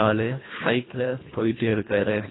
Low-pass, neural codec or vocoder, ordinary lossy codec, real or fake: 7.2 kHz; codec, 24 kHz, 1.5 kbps, HILCodec; AAC, 16 kbps; fake